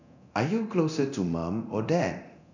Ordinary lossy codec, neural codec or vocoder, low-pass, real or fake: none; codec, 24 kHz, 0.9 kbps, DualCodec; 7.2 kHz; fake